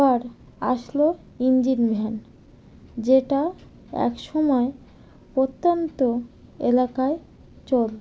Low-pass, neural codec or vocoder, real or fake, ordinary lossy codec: none; none; real; none